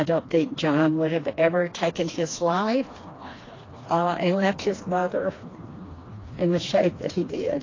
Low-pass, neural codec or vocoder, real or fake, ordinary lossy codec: 7.2 kHz; codec, 16 kHz, 2 kbps, FreqCodec, smaller model; fake; AAC, 32 kbps